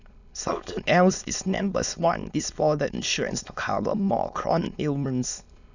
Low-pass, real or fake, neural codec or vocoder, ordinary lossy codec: 7.2 kHz; fake; autoencoder, 22.05 kHz, a latent of 192 numbers a frame, VITS, trained on many speakers; none